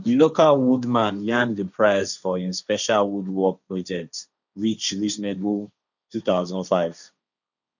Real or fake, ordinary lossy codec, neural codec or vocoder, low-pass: fake; none; codec, 16 kHz, 1.1 kbps, Voila-Tokenizer; 7.2 kHz